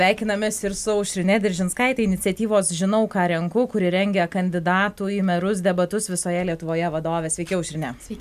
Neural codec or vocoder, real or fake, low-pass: none; real; 14.4 kHz